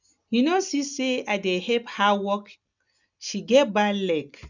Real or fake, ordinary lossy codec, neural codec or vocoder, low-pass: real; none; none; 7.2 kHz